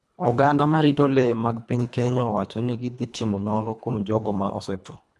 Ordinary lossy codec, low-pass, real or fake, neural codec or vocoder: none; none; fake; codec, 24 kHz, 1.5 kbps, HILCodec